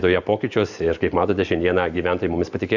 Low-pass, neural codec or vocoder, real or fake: 7.2 kHz; none; real